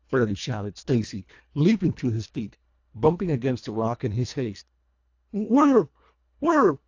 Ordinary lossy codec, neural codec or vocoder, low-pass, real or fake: AAC, 48 kbps; codec, 24 kHz, 1.5 kbps, HILCodec; 7.2 kHz; fake